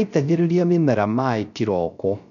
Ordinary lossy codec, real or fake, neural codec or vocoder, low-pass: none; fake; codec, 16 kHz, 0.3 kbps, FocalCodec; 7.2 kHz